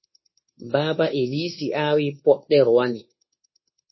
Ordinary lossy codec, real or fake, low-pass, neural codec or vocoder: MP3, 24 kbps; fake; 7.2 kHz; codec, 24 kHz, 1.2 kbps, DualCodec